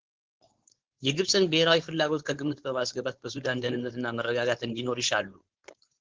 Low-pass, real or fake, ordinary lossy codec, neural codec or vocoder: 7.2 kHz; fake; Opus, 16 kbps; codec, 16 kHz, 4.8 kbps, FACodec